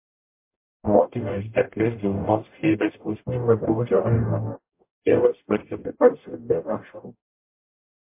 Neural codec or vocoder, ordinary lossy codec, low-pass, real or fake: codec, 44.1 kHz, 0.9 kbps, DAC; MP3, 24 kbps; 3.6 kHz; fake